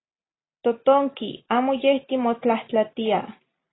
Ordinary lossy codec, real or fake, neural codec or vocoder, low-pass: AAC, 16 kbps; real; none; 7.2 kHz